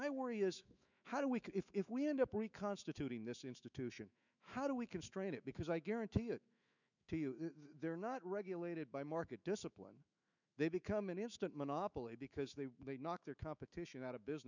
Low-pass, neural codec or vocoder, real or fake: 7.2 kHz; autoencoder, 48 kHz, 128 numbers a frame, DAC-VAE, trained on Japanese speech; fake